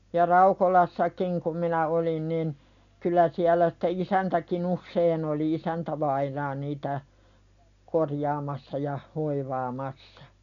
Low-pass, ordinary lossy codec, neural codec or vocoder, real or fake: 7.2 kHz; none; none; real